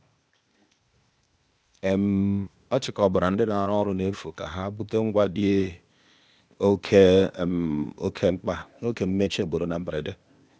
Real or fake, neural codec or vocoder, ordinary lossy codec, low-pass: fake; codec, 16 kHz, 0.8 kbps, ZipCodec; none; none